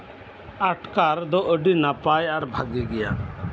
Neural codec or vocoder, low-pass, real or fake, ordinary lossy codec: none; none; real; none